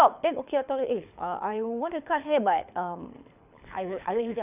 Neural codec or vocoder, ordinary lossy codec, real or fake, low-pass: codec, 24 kHz, 6 kbps, HILCodec; none; fake; 3.6 kHz